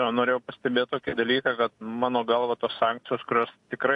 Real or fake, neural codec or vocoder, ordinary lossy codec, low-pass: real; none; AAC, 64 kbps; 10.8 kHz